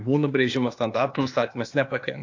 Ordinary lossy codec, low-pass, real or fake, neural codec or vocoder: AAC, 48 kbps; 7.2 kHz; fake; codec, 16 kHz, 0.8 kbps, ZipCodec